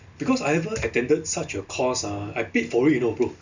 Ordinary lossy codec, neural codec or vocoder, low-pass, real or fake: none; none; 7.2 kHz; real